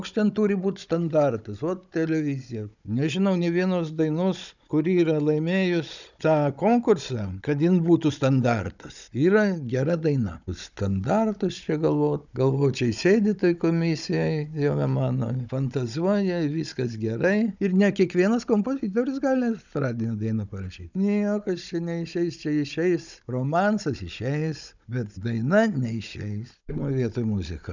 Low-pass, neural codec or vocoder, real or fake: 7.2 kHz; codec, 16 kHz, 16 kbps, FunCodec, trained on Chinese and English, 50 frames a second; fake